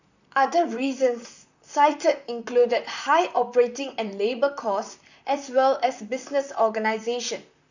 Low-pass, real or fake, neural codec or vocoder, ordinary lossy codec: 7.2 kHz; fake; vocoder, 44.1 kHz, 128 mel bands, Pupu-Vocoder; none